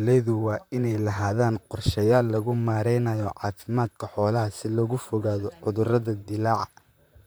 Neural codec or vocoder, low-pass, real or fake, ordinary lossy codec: vocoder, 44.1 kHz, 128 mel bands, Pupu-Vocoder; none; fake; none